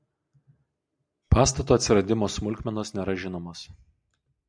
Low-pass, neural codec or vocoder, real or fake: 9.9 kHz; none; real